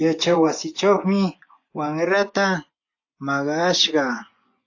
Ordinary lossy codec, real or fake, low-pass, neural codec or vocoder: AAC, 48 kbps; fake; 7.2 kHz; vocoder, 24 kHz, 100 mel bands, Vocos